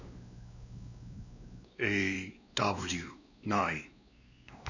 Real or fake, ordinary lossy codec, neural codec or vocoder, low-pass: fake; none; codec, 16 kHz, 2 kbps, X-Codec, WavLM features, trained on Multilingual LibriSpeech; 7.2 kHz